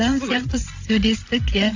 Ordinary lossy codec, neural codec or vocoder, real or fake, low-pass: AAC, 48 kbps; codec, 16 kHz, 16 kbps, FreqCodec, larger model; fake; 7.2 kHz